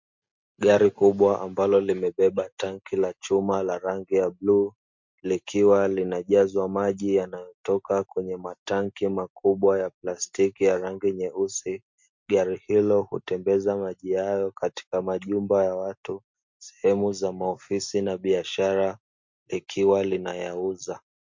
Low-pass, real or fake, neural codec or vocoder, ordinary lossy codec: 7.2 kHz; real; none; MP3, 48 kbps